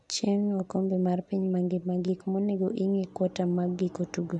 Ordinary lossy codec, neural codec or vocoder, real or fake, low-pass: none; none; real; 10.8 kHz